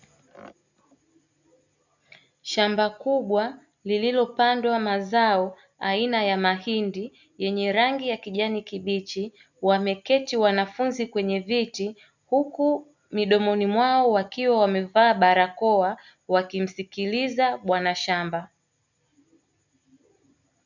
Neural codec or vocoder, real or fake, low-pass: none; real; 7.2 kHz